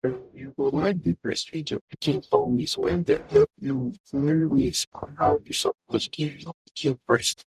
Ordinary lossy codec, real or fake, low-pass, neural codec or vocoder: none; fake; 14.4 kHz; codec, 44.1 kHz, 0.9 kbps, DAC